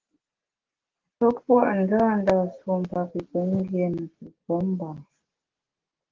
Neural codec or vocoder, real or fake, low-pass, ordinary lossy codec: none; real; 7.2 kHz; Opus, 16 kbps